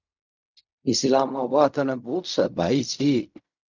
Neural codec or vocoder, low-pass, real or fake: codec, 16 kHz in and 24 kHz out, 0.4 kbps, LongCat-Audio-Codec, fine tuned four codebook decoder; 7.2 kHz; fake